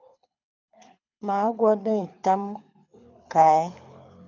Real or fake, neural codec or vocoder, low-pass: fake; codec, 24 kHz, 6 kbps, HILCodec; 7.2 kHz